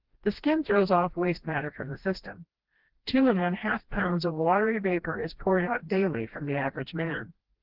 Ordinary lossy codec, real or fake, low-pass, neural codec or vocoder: Opus, 16 kbps; fake; 5.4 kHz; codec, 16 kHz, 1 kbps, FreqCodec, smaller model